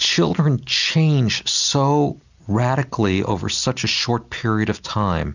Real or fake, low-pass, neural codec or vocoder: real; 7.2 kHz; none